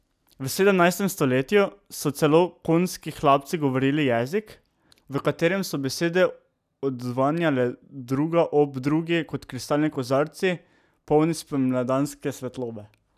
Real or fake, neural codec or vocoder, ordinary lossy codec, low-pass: real; none; none; 14.4 kHz